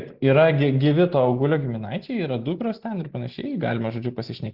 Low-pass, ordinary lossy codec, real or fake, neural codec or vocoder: 5.4 kHz; Opus, 16 kbps; real; none